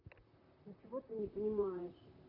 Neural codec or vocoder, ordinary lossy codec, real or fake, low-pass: vocoder, 44.1 kHz, 128 mel bands, Pupu-Vocoder; none; fake; 5.4 kHz